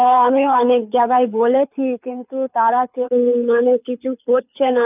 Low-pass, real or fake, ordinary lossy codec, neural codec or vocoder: 3.6 kHz; fake; none; codec, 24 kHz, 6 kbps, HILCodec